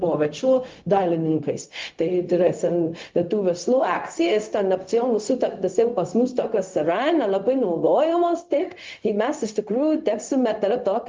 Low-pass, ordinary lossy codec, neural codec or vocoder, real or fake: 7.2 kHz; Opus, 32 kbps; codec, 16 kHz, 0.4 kbps, LongCat-Audio-Codec; fake